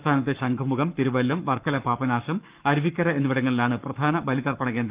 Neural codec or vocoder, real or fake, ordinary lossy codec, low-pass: codec, 44.1 kHz, 7.8 kbps, Pupu-Codec; fake; Opus, 24 kbps; 3.6 kHz